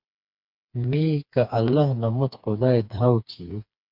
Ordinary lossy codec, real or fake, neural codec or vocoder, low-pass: MP3, 48 kbps; fake; codec, 16 kHz, 4 kbps, FreqCodec, smaller model; 5.4 kHz